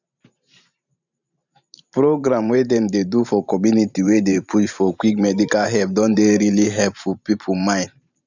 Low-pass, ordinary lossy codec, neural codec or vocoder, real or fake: 7.2 kHz; none; vocoder, 44.1 kHz, 128 mel bands every 512 samples, BigVGAN v2; fake